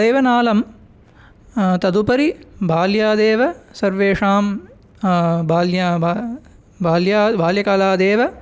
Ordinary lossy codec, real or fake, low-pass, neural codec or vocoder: none; real; none; none